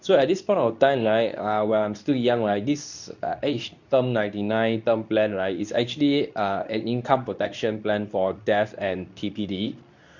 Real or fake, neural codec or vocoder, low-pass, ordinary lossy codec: fake; codec, 24 kHz, 0.9 kbps, WavTokenizer, medium speech release version 2; 7.2 kHz; none